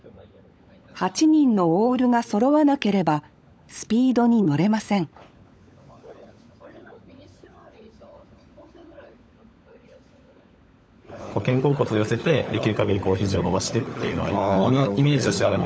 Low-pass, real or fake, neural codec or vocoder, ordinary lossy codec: none; fake; codec, 16 kHz, 16 kbps, FunCodec, trained on LibriTTS, 50 frames a second; none